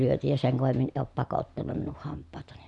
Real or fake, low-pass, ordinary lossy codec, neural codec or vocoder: real; 10.8 kHz; none; none